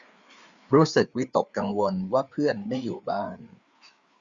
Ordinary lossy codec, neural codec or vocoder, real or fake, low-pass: Opus, 64 kbps; codec, 16 kHz, 4 kbps, FreqCodec, larger model; fake; 7.2 kHz